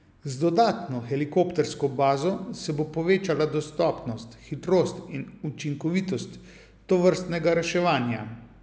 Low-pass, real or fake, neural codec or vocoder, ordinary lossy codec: none; real; none; none